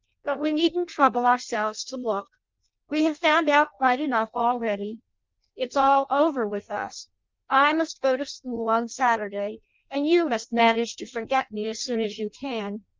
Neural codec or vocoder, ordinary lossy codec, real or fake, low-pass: codec, 16 kHz in and 24 kHz out, 0.6 kbps, FireRedTTS-2 codec; Opus, 24 kbps; fake; 7.2 kHz